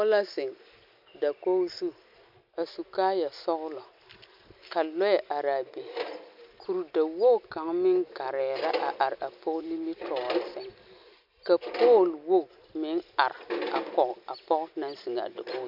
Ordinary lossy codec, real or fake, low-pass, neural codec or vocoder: MP3, 48 kbps; real; 5.4 kHz; none